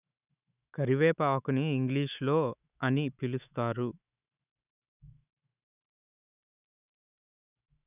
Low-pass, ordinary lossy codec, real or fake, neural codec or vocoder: 3.6 kHz; none; fake; autoencoder, 48 kHz, 128 numbers a frame, DAC-VAE, trained on Japanese speech